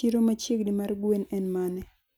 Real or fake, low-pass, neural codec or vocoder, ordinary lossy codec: real; none; none; none